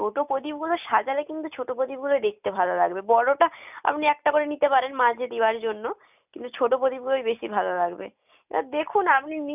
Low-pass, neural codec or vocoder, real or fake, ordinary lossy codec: 3.6 kHz; none; real; none